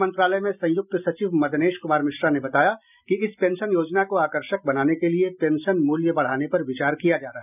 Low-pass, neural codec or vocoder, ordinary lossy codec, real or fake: 3.6 kHz; none; none; real